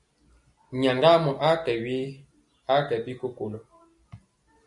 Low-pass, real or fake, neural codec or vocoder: 10.8 kHz; real; none